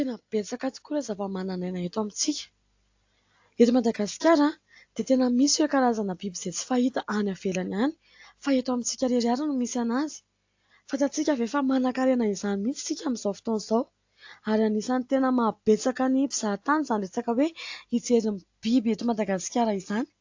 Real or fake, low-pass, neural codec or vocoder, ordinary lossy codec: real; 7.2 kHz; none; AAC, 48 kbps